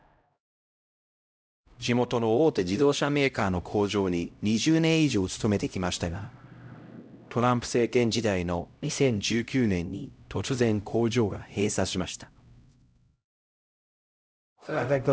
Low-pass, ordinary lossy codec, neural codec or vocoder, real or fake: none; none; codec, 16 kHz, 0.5 kbps, X-Codec, HuBERT features, trained on LibriSpeech; fake